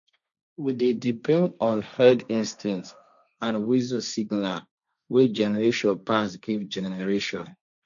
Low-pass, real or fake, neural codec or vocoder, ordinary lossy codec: 7.2 kHz; fake; codec, 16 kHz, 1.1 kbps, Voila-Tokenizer; none